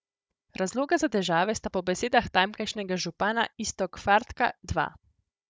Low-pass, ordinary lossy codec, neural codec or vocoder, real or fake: none; none; codec, 16 kHz, 16 kbps, FunCodec, trained on Chinese and English, 50 frames a second; fake